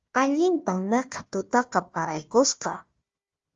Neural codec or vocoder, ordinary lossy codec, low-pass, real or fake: codec, 16 kHz, 1 kbps, FunCodec, trained on Chinese and English, 50 frames a second; Opus, 32 kbps; 7.2 kHz; fake